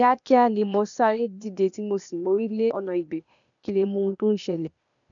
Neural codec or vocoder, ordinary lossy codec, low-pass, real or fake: codec, 16 kHz, 0.8 kbps, ZipCodec; none; 7.2 kHz; fake